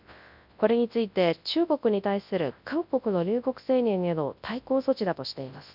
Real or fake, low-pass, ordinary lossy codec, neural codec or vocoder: fake; 5.4 kHz; none; codec, 24 kHz, 0.9 kbps, WavTokenizer, large speech release